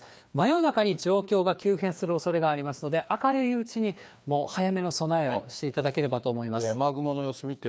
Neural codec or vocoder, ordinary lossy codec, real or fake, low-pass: codec, 16 kHz, 2 kbps, FreqCodec, larger model; none; fake; none